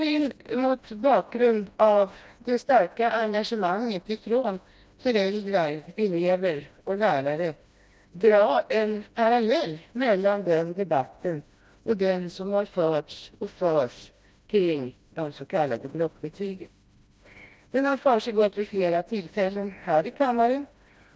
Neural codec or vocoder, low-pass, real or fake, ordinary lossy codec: codec, 16 kHz, 1 kbps, FreqCodec, smaller model; none; fake; none